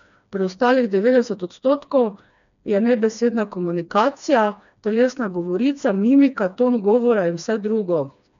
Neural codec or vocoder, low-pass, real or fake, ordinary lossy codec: codec, 16 kHz, 2 kbps, FreqCodec, smaller model; 7.2 kHz; fake; none